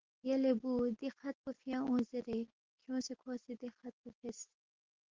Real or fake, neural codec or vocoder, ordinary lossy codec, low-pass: real; none; Opus, 16 kbps; 7.2 kHz